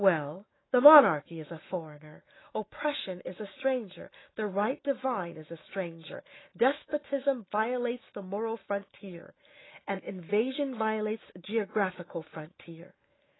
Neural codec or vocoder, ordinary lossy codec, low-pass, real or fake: autoencoder, 48 kHz, 128 numbers a frame, DAC-VAE, trained on Japanese speech; AAC, 16 kbps; 7.2 kHz; fake